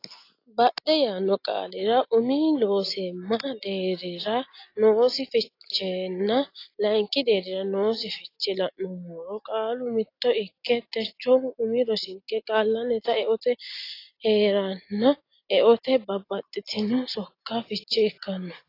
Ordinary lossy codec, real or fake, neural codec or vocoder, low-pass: AAC, 32 kbps; real; none; 5.4 kHz